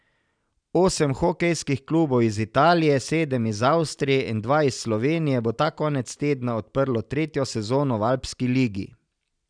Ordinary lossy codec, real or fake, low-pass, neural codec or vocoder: none; real; 9.9 kHz; none